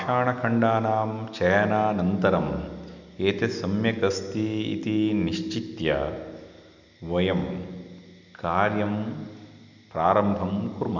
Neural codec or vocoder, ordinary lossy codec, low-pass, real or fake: none; none; 7.2 kHz; real